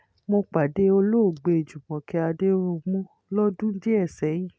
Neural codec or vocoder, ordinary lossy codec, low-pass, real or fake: none; none; none; real